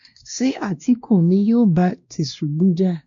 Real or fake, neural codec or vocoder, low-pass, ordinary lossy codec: fake; codec, 16 kHz, 1 kbps, X-Codec, WavLM features, trained on Multilingual LibriSpeech; 7.2 kHz; MP3, 48 kbps